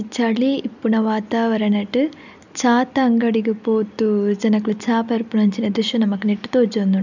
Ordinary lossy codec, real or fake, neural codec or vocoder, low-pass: none; real; none; 7.2 kHz